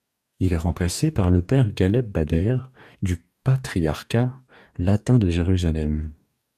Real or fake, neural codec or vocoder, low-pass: fake; codec, 44.1 kHz, 2.6 kbps, DAC; 14.4 kHz